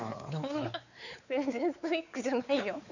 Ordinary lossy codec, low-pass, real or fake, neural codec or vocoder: none; 7.2 kHz; fake; codec, 16 kHz, 4 kbps, X-Codec, WavLM features, trained on Multilingual LibriSpeech